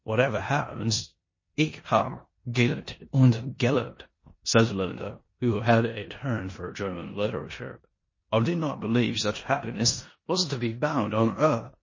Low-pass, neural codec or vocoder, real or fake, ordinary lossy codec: 7.2 kHz; codec, 16 kHz in and 24 kHz out, 0.9 kbps, LongCat-Audio-Codec, four codebook decoder; fake; MP3, 32 kbps